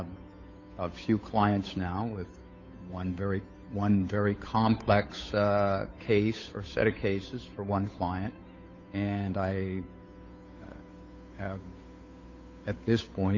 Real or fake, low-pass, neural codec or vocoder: fake; 7.2 kHz; codec, 16 kHz, 8 kbps, FunCodec, trained on Chinese and English, 25 frames a second